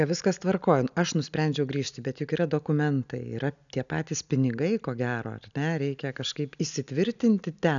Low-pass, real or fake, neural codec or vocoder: 7.2 kHz; real; none